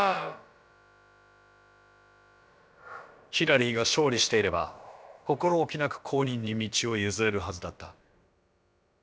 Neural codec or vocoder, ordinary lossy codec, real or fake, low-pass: codec, 16 kHz, about 1 kbps, DyCAST, with the encoder's durations; none; fake; none